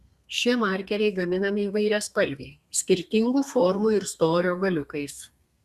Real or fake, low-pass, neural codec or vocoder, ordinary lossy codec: fake; 14.4 kHz; codec, 32 kHz, 1.9 kbps, SNAC; Opus, 64 kbps